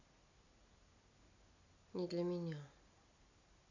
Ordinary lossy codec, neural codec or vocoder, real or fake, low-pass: AAC, 48 kbps; none; real; 7.2 kHz